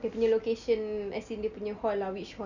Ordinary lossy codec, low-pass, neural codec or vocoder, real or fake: none; 7.2 kHz; none; real